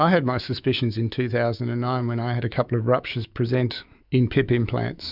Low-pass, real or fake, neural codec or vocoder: 5.4 kHz; fake; autoencoder, 48 kHz, 128 numbers a frame, DAC-VAE, trained on Japanese speech